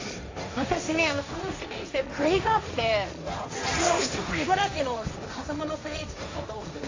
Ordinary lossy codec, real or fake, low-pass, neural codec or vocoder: AAC, 48 kbps; fake; 7.2 kHz; codec, 16 kHz, 1.1 kbps, Voila-Tokenizer